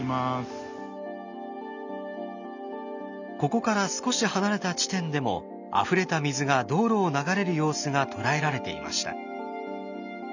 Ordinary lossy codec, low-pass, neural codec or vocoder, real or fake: none; 7.2 kHz; none; real